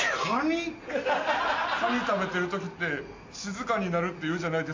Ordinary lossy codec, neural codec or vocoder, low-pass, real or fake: AAC, 48 kbps; none; 7.2 kHz; real